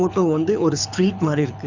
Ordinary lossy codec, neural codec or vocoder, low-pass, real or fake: none; codec, 24 kHz, 6 kbps, HILCodec; 7.2 kHz; fake